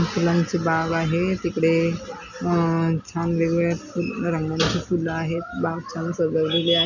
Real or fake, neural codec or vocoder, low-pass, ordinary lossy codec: real; none; 7.2 kHz; none